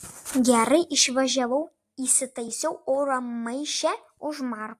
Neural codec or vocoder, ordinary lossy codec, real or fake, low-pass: none; AAC, 64 kbps; real; 14.4 kHz